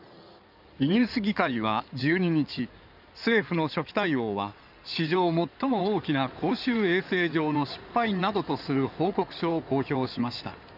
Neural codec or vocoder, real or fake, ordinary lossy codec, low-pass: codec, 16 kHz in and 24 kHz out, 2.2 kbps, FireRedTTS-2 codec; fake; none; 5.4 kHz